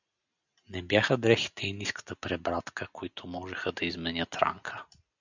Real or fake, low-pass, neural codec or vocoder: real; 7.2 kHz; none